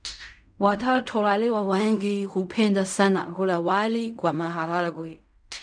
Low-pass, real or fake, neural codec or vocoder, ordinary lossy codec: 9.9 kHz; fake; codec, 16 kHz in and 24 kHz out, 0.4 kbps, LongCat-Audio-Codec, fine tuned four codebook decoder; none